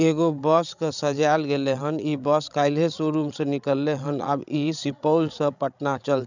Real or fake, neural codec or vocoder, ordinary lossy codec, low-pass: fake; codec, 16 kHz, 16 kbps, FreqCodec, larger model; none; 7.2 kHz